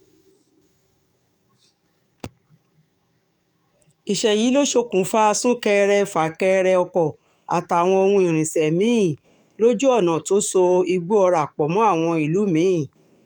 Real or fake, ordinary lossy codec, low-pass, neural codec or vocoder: fake; none; 19.8 kHz; codec, 44.1 kHz, 7.8 kbps, DAC